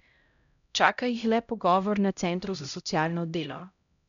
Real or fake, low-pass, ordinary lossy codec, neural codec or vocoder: fake; 7.2 kHz; none; codec, 16 kHz, 0.5 kbps, X-Codec, HuBERT features, trained on LibriSpeech